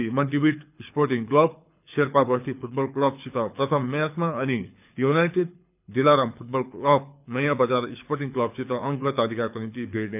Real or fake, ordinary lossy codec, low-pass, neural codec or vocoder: fake; none; 3.6 kHz; codec, 24 kHz, 6 kbps, HILCodec